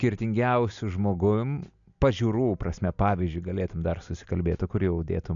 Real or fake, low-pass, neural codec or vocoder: real; 7.2 kHz; none